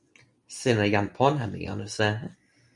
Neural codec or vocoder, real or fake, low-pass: none; real; 10.8 kHz